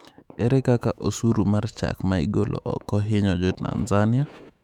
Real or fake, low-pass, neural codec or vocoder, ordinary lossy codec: fake; 19.8 kHz; autoencoder, 48 kHz, 128 numbers a frame, DAC-VAE, trained on Japanese speech; none